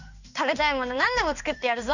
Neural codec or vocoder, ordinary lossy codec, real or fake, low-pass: codec, 16 kHz in and 24 kHz out, 1 kbps, XY-Tokenizer; none; fake; 7.2 kHz